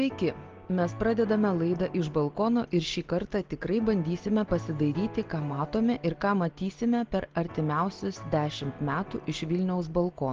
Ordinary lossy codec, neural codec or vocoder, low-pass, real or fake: Opus, 16 kbps; none; 7.2 kHz; real